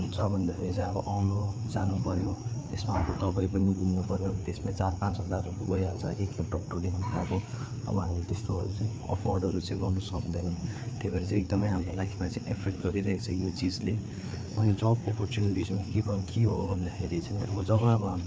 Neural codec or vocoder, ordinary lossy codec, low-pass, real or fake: codec, 16 kHz, 4 kbps, FreqCodec, larger model; none; none; fake